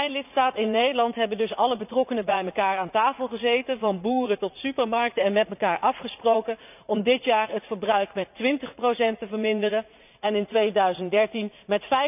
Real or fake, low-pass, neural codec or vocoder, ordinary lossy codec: fake; 3.6 kHz; vocoder, 44.1 kHz, 80 mel bands, Vocos; none